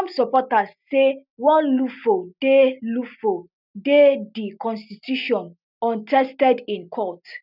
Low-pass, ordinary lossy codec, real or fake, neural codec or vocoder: 5.4 kHz; none; real; none